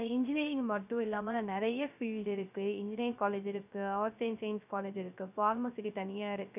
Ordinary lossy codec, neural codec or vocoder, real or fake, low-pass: none; codec, 16 kHz, 0.3 kbps, FocalCodec; fake; 3.6 kHz